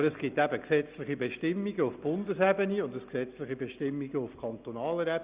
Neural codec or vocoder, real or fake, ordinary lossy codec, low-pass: none; real; Opus, 64 kbps; 3.6 kHz